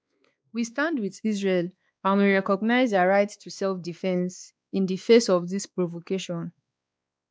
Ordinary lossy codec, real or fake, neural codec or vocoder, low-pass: none; fake; codec, 16 kHz, 2 kbps, X-Codec, WavLM features, trained on Multilingual LibriSpeech; none